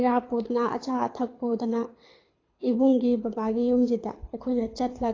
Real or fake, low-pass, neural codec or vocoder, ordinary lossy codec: fake; 7.2 kHz; codec, 24 kHz, 6 kbps, HILCodec; AAC, 32 kbps